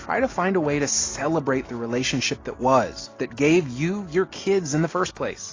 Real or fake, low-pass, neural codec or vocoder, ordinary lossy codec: real; 7.2 kHz; none; AAC, 32 kbps